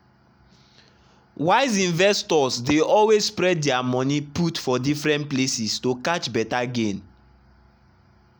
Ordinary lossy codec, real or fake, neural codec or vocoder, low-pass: none; real; none; none